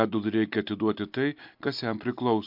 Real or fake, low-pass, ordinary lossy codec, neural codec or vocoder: real; 5.4 kHz; MP3, 48 kbps; none